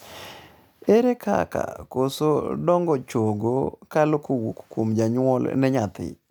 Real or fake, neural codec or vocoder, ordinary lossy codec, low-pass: real; none; none; none